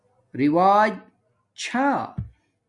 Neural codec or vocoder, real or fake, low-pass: none; real; 10.8 kHz